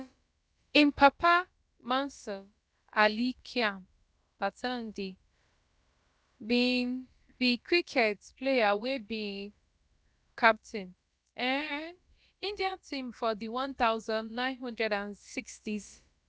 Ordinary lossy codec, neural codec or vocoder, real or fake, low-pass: none; codec, 16 kHz, about 1 kbps, DyCAST, with the encoder's durations; fake; none